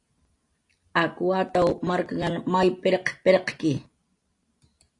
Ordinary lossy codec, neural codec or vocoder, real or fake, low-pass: AAC, 48 kbps; none; real; 10.8 kHz